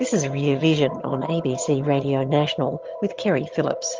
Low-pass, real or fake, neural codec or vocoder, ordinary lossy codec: 7.2 kHz; fake; vocoder, 22.05 kHz, 80 mel bands, HiFi-GAN; Opus, 32 kbps